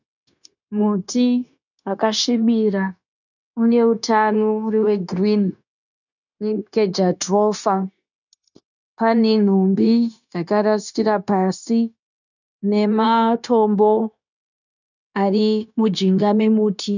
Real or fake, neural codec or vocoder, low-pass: fake; codec, 16 kHz, 0.9 kbps, LongCat-Audio-Codec; 7.2 kHz